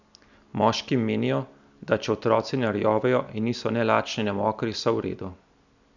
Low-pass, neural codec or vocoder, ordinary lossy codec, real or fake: 7.2 kHz; none; none; real